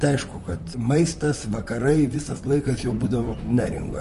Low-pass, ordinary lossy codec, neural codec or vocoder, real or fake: 14.4 kHz; MP3, 48 kbps; vocoder, 44.1 kHz, 128 mel bands, Pupu-Vocoder; fake